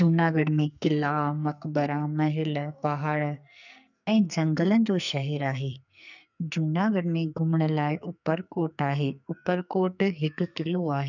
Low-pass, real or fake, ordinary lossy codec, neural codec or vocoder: 7.2 kHz; fake; none; codec, 44.1 kHz, 2.6 kbps, SNAC